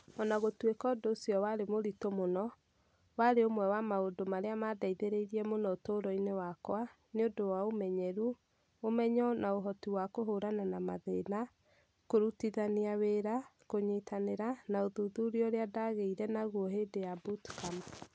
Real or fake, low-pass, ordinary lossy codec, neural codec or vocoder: real; none; none; none